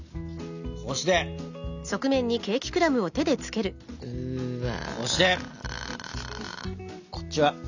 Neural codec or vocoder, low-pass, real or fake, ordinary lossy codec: none; 7.2 kHz; real; none